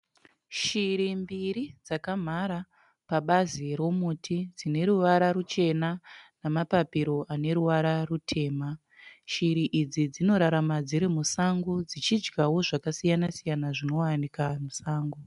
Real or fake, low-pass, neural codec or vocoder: real; 10.8 kHz; none